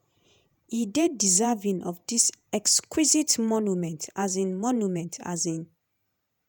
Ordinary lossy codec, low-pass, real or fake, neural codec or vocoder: none; none; fake; vocoder, 48 kHz, 128 mel bands, Vocos